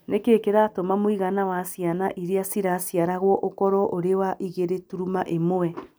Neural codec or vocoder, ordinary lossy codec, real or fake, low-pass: none; none; real; none